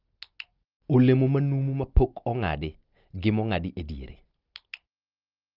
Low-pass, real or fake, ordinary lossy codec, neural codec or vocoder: 5.4 kHz; real; Opus, 32 kbps; none